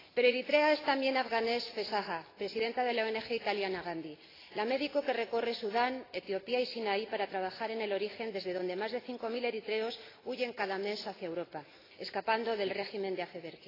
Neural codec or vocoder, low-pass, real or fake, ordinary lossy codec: none; 5.4 kHz; real; AAC, 24 kbps